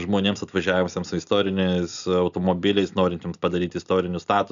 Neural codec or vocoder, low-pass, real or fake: none; 7.2 kHz; real